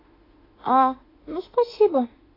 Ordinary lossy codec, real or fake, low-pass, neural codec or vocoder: AAC, 24 kbps; fake; 5.4 kHz; autoencoder, 48 kHz, 32 numbers a frame, DAC-VAE, trained on Japanese speech